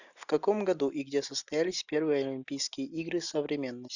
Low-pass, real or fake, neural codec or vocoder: 7.2 kHz; real; none